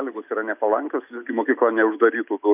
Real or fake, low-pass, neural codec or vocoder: real; 3.6 kHz; none